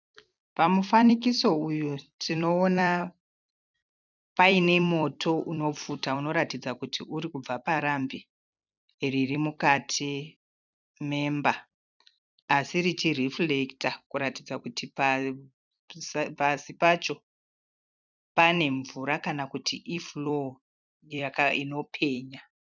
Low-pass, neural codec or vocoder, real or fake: 7.2 kHz; vocoder, 44.1 kHz, 128 mel bands every 256 samples, BigVGAN v2; fake